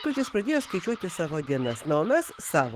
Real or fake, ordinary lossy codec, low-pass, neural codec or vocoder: fake; Opus, 16 kbps; 14.4 kHz; autoencoder, 48 kHz, 128 numbers a frame, DAC-VAE, trained on Japanese speech